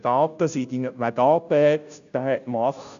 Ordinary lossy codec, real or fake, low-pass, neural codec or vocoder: AAC, 96 kbps; fake; 7.2 kHz; codec, 16 kHz, 0.5 kbps, FunCodec, trained on Chinese and English, 25 frames a second